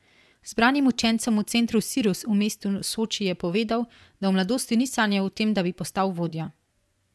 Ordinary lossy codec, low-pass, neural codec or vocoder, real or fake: none; none; vocoder, 24 kHz, 100 mel bands, Vocos; fake